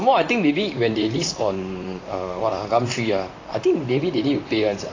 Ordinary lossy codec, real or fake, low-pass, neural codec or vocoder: AAC, 32 kbps; fake; 7.2 kHz; vocoder, 44.1 kHz, 128 mel bands, Pupu-Vocoder